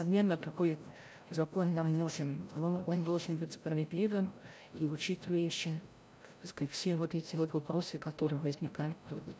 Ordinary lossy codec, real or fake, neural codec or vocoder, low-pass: none; fake; codec, 16 kHz, 0.5 kbps, FreqCodec, larger model; none